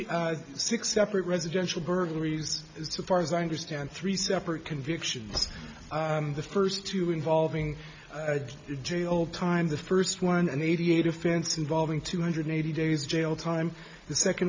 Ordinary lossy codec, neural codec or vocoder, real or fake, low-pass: MP3, 48 kbps; none; real; 7.2 kHz